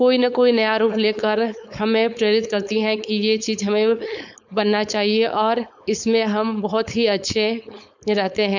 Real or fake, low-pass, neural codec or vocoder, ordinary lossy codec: fake; 7.2 kHz; codec, 16 kHz, 4.8 kbps, FACodec; none